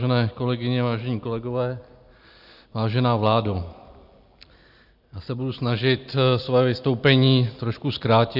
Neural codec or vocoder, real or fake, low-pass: none; real; 5.4 kHz